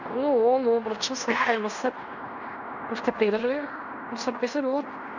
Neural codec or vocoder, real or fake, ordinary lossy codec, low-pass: codec, 16 kHz in and 24 kHz out, 0.9 kbps, LongCat-Audio-Codec, fine tuned four codebook decoder; fake; none; 7.2 kHz